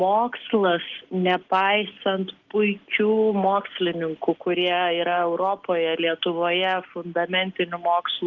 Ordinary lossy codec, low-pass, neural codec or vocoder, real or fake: Opus, 16 kbps; 7.2 kHz; none; real